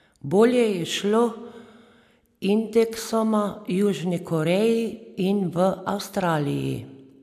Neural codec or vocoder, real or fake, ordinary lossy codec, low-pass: none; real; MP3, 96 kbps; 14.4 kHz